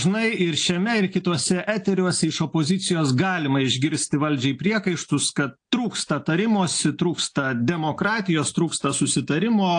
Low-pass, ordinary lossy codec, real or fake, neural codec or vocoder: 9.9 kHz; AAC, 48 kbps; real; none